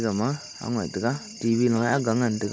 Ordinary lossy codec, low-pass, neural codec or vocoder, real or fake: none; none; none; real